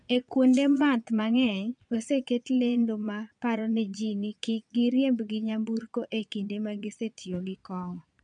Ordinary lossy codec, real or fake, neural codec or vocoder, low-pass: none; fake; vocoder, 22.05 kHz, 80 mel bands, Vocos; 9.9 kHz